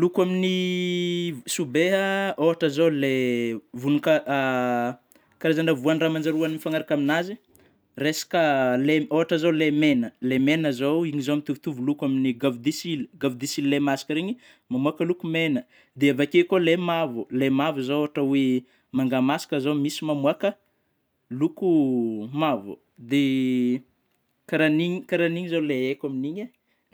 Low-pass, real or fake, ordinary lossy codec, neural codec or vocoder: none; real; none; none